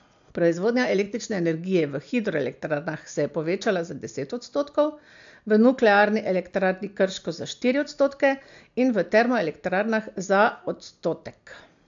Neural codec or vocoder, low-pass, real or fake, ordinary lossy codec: none; 7.2 kHz; real; AAC, 64 kbps